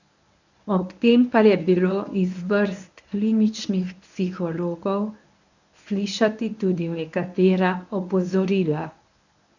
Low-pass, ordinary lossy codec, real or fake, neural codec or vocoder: 7.2 kHz; none; fake; codec, 24 kHz, 0.9 kbps, WavTokenizer, medium speech release version 1